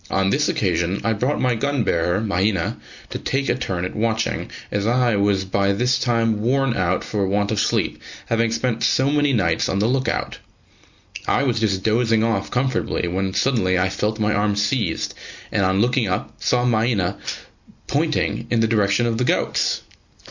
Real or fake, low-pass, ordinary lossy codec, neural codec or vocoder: real; 7.2 kHz; Opus, 64 kbps; none